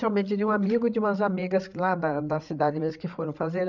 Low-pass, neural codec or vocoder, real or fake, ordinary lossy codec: 7.2 kHz; codec, 16 kHz, 8 kbps, FreqCodec, larger model; fake; none